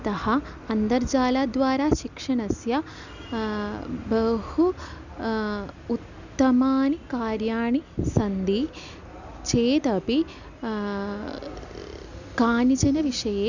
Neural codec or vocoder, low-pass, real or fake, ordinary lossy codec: none; 7.2 kHz; real; none